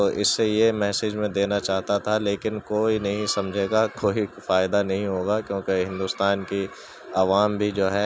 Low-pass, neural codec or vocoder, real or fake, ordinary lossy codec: none; none; real; none